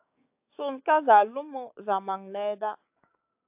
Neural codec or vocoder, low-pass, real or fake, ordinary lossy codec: codec, 16 kHz, 6 kbps, DAC; 3.6 kHz; fake; AAC, 32 kbps